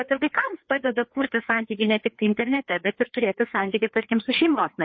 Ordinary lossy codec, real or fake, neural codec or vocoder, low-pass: MP3, 32 kbps; fake; codec, 16 kHz, 2 kbps, FreqCodec, larger model; 7.2 kHz